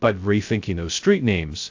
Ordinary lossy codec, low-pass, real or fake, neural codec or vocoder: AAC, 48 kbps; 7.2 kHz; fake; codec, 16 kHz, 0.2 kbps, FocalCodec